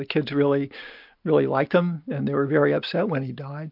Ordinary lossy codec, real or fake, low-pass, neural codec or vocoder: MP3, 48 kbps; real; 5.4 kHz; none